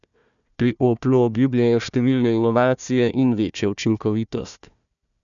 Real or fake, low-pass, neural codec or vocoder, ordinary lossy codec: fake; 7.2 kHz; codec, 16 kHz, 1 kbps, FunCodec, trained on Chinese and English, 50 frames a second; none